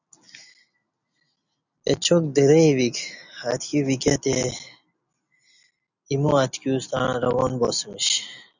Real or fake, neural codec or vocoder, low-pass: real; none; 7.2 kHz